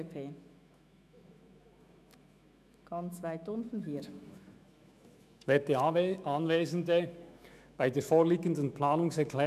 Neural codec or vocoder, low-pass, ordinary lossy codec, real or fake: autoencoder, 48 kHz, 128 numbers a frame, DAC-VAE, trained on Japanese speech; 14.4 kHz; none; fake